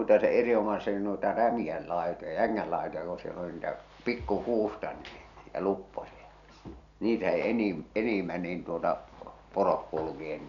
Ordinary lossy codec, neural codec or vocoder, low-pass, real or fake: none; none; 7.2 kHz; real